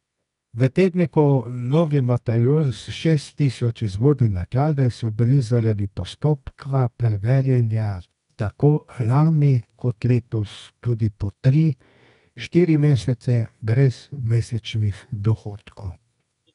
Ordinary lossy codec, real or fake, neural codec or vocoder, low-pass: none; fake; codec, 24 kHz, 0.9 kbps, WavTokenizer, medium music audio release; 10.8 kHz